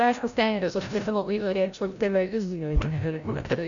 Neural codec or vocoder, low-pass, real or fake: codec, 16 kHz, 0.5 kbps, FreqCodec, larger model; 7.2 kHz; fake